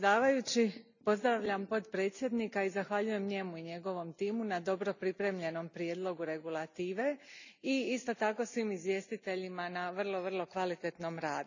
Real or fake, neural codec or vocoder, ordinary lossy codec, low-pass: real; none; none; 7.2 kHz